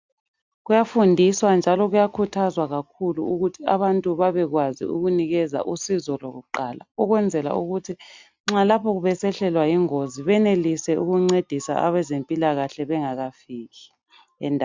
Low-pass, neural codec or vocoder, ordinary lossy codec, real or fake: 7.2 kHz; none; MP3, 64 kbps; real